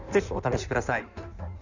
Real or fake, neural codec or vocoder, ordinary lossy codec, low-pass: fake; codec, 16 kHz in and 24 kHz out, 1.1 kbps, FireRedTTS-2 codec; none; 7.2 kHz